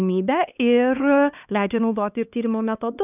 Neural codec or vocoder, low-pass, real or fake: codec, 16 kHz, 2 kbps, X-Codec, HuBERT features, trained on LibriSpeech; 3.6 kHz; fake